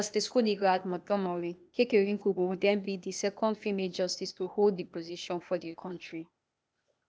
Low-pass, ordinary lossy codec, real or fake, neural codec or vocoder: none; none; fake; codec, 16 kHz, 0.8 kbps, ZipCodec